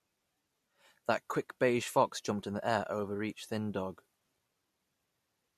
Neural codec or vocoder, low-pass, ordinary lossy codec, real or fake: none; 14.4 kHz; MP3, 64 kbps; real